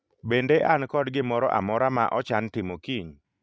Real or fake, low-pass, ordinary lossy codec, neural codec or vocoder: real; none; none; none